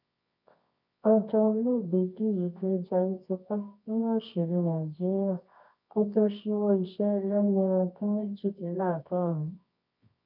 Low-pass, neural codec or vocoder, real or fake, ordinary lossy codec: 5.4 kHz; codec, 24 kHz, 0.9 kbps, WavTokenizer, medium music audio release; fake; none